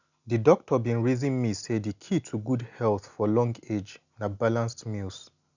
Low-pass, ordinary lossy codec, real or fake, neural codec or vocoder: 7.2 kHz; none; real; none